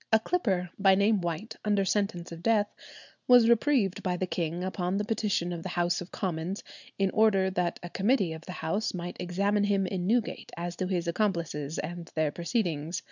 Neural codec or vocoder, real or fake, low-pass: none; real; 7.2 kHz